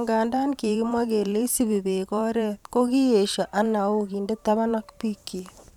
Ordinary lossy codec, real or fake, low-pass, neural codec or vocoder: none; fake; 19.8 kHz; vocoder, 44.1 kHz, 128 mel bands every 512 samples, BigVGAN v2